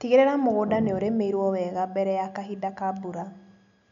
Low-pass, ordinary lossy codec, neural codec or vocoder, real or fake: 7.2 kHz; none; none; real